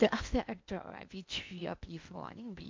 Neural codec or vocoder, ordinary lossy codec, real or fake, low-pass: codec, 16 kHz in and 24 kHz out, 0.8 kbps, FocalCodec, streaming, 65536 codes; MP3, 64 kbps; fake; 7.2 kHz